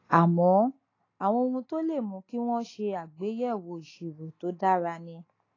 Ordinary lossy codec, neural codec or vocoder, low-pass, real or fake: AAC, 32 kbps; none; 7.2 kHz; real